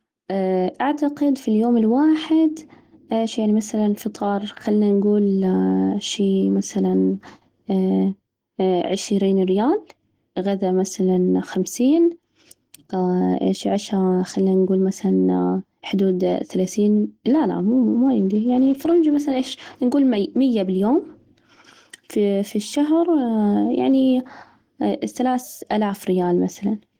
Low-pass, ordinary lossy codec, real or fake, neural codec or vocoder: 19.8 kHz; Opus, 16 kbps; real; none